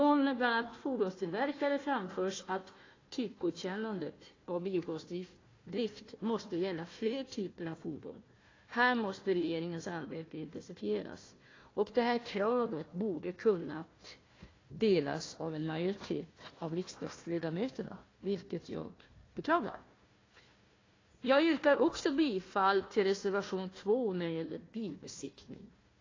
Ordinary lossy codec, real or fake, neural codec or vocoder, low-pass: AAC, 32 kbps; fake; codec, 16 kHz, 1 kbps, FunCodec, trained on Chinese and English, 50 frames a second; 7.2 kHz